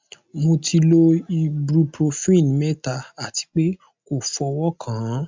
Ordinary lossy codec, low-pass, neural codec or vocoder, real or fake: MP3, 64 kbps; 7.2 kHz; none; real